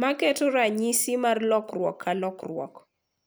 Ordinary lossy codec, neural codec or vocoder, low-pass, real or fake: none; none; none; real